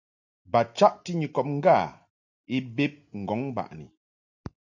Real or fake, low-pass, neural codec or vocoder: real; 7.2 kHz; none